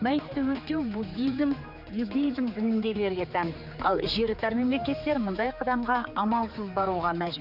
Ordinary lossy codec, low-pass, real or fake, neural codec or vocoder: none; 5.4 kHz; fake; codec, 16 kHz, 4 kbps, X-Codec, HuBERT features, trained on general audio